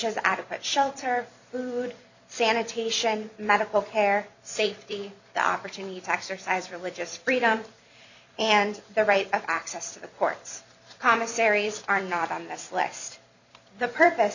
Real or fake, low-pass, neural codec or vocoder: fake; 7.2 kHz; vocoder, 44.1 kHz, 128 mel bands every 256 samples, BigVGAN v2